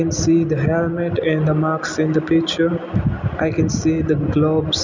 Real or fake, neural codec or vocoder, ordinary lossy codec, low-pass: real; none; none; 7.2 kHz